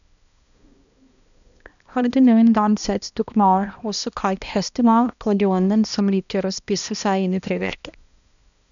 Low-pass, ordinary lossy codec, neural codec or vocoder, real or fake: 7.2 kHz; none; codec, 16 kHz, 1 kbps, X-Codec, HuBERT features, trained on balanced general audio; fake